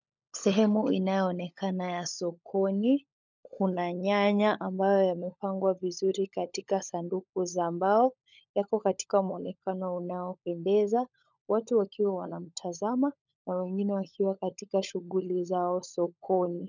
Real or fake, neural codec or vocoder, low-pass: fake; codec, 16 kHz, 16 kbps, FunCodec, trained on LibriTTS, 50 frames a second; 7.2 kHz